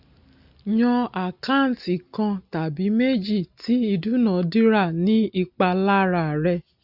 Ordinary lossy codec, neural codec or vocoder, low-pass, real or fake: none; none; 5.4 kHz; real